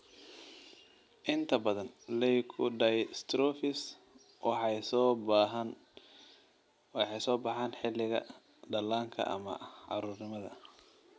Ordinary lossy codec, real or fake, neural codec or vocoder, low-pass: none; real; none; none